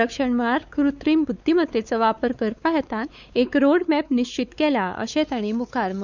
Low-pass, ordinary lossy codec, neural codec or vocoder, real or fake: 7.2 kHz; none; codec, 16 kHz, 4 kbps, X-Codec, WavLM features, trained on Multilingual LibriSpeech; fake